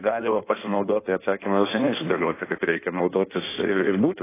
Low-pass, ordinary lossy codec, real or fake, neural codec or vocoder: 3.6 kHz; AAC, 16 kbps; fake; codec, 16 kHz in and 24 kHz out, 1.1 kbps, FireRedTTS-2 codec